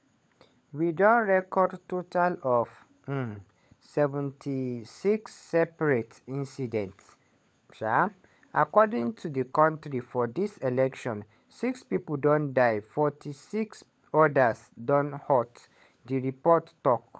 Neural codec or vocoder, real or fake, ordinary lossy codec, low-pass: codec, 16 kHz, 16 kbps, FunCodec, trained on LibriTTS, 50 frames a second; fake; none; none